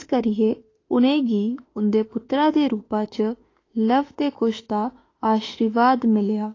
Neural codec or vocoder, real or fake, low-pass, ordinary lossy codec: autoencoder, 48 kHz, 32 numbers a frame, DAC-VAE, trained on Japanese speech; fake; 7.2 kHz; AAC, 32 kbps